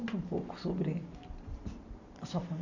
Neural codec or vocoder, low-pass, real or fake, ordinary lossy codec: none; 7.2 kHz; real; none